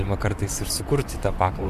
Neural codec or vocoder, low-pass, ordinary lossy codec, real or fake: vocoder, 44.1 kHz, 128 mel bands, Pupu-Vocoder; 14.4 kHz; MP3, 96 kbps; fake